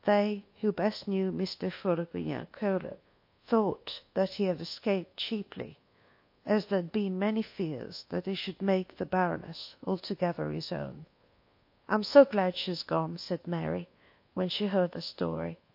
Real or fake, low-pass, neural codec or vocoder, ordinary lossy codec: fake; 5.4 kHz; codec, 24 kHz, 1.2 kbps, DualCodec; MP3, 32 kbps